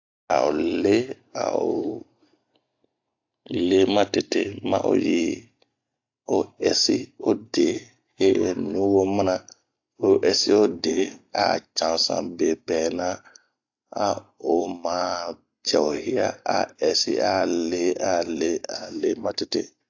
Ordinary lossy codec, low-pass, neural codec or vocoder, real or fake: AAC, 48 kbps; 7.2 kHz; none; real